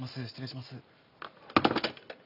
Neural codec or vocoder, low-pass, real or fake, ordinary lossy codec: none; 5.4 kHz; real; none